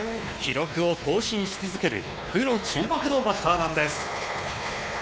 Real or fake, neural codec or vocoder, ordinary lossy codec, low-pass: fake; codec, 16 kHz, 2 kbps, X-Codec, WavLM features, trained on Multilingual LibriSpeech; none; none